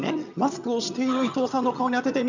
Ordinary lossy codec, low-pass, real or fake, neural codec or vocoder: none; 7.2 kHz; fake; vocoder, 22.05 kHz, 80 mel bands, HiFi-GAN